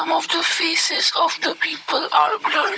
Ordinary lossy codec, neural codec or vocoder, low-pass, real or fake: none; codec, 16 kHz, 16 kbps, FunCodec, trained on Chinese and English, 50 frames a second; none; fake